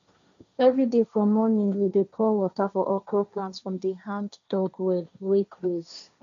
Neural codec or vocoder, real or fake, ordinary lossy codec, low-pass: codec, 16 kHz, 1.1 kbps, Voila-Tokenizer; fake; none; 7.2 kHz